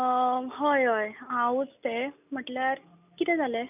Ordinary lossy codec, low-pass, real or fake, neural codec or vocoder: none; 3.6 kHz; real; none